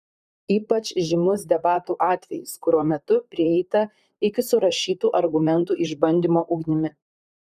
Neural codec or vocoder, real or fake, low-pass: vocoder, 44.1 kHz, 128 mel bands, Pupu-Vocoder; fake; 14.4 kHz